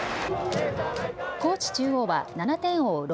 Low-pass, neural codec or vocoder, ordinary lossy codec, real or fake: none; none; none; real